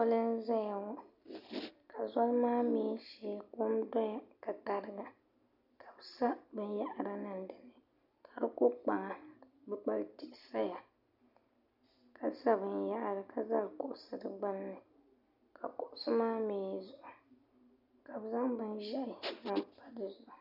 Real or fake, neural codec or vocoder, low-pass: real; none; 5.4 kHz